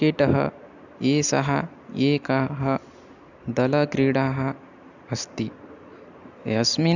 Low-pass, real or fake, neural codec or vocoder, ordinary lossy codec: 7.2 kHz; real; none; none